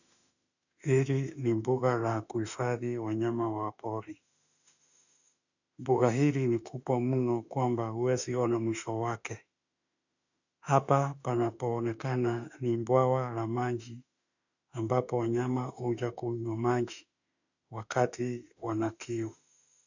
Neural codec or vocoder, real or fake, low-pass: autoencoder, 48 kHz, 32 numbers a frame, DAC-VAE, trained on Japanese speech; fake; 7.2 kHz